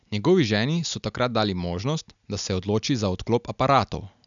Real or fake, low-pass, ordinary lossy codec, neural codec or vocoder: real; 7.2 kHz; none; none